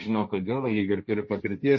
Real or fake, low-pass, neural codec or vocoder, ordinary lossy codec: fake; 7.2 kHz; codec, 16 kHz, 1.1 kbps, Voila-Tokenizer; MP3, 32 kbps